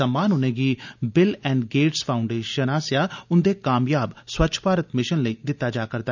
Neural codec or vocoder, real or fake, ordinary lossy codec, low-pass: none; real; none; 7.2 kHz